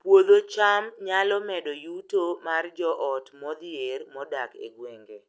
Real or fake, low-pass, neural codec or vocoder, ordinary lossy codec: real; none; none; none